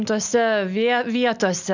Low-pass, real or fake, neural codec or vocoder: 7.2 kHz; real; none